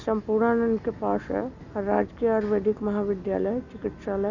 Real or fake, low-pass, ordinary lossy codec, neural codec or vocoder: real; 7.2 kHz; none; none